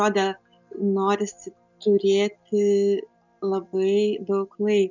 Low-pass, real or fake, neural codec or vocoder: 7.2 kHz; real; none